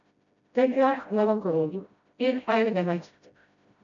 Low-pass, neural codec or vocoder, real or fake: 7.2 kHz; codec, 16 kHz, 0.5 kbps, FreqCodec, smaller model; fake